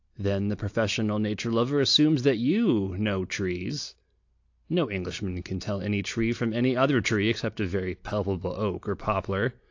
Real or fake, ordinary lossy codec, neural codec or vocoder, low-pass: real; AAC, 48 kbps; none; 7.2 kHz